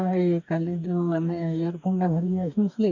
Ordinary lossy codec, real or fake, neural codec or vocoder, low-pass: none; fake; codec, 44.1 kHz, 2.6 kbps, DAC; 7.2 kHz